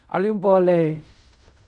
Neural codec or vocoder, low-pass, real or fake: codec, 16 kHz in and 24 kHz out, 0.4 kbps, LongCat-Audio-Codec, fine tuned four codebook decoder; 10.8 kHz; fake